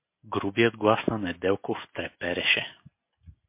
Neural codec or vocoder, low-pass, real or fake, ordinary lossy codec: none; 3.6 kHz; real; MP3, 24 kbps